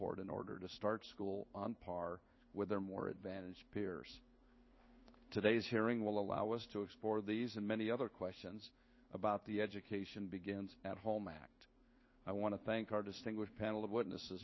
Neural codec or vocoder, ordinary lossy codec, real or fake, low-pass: codec, 16 kHz in and 24 kHz out, 1 kbps, XY-Tokenizer; MP3, 24 kbps; fake; 7.2 kHz